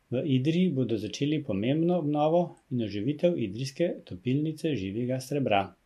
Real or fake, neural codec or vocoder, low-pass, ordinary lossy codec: real; none; 14.4 kHz; MP3, 64 kbps